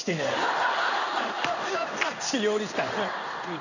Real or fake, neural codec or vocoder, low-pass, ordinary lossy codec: fake; codec, 16 kHz in and 24 kHz out, 1 kbps, XY-Tokenizer; 7.2 kHz; none